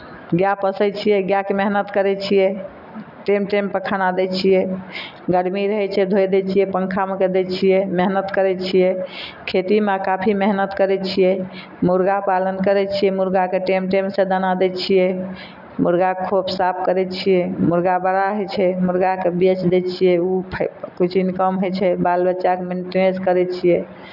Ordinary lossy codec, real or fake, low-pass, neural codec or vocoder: none; real; 5.4 kHz; none